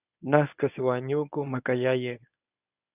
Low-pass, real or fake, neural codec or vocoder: 3.6 kHz; fake; codec, 24 kHz, 0.9 kbps, WavTokenizer, medium speech release version 1